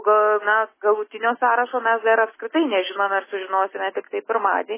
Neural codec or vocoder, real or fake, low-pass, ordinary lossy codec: none; real; 3.6 kHz; MP3, 16 kbps